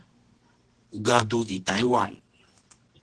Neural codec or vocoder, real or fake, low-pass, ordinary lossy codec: codec, 24 kHz, 0.9 kbps, WavTokenizer, medium music audio release; fake; 10.8 kHz; Opus, 16 kbps